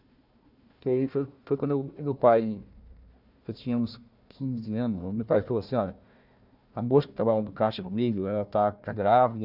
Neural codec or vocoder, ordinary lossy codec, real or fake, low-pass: codec, 16 kHz, 1 kbps, FunCodec, trained on Chinese and English, 50 frames a second; none; fake; 5.4 kHz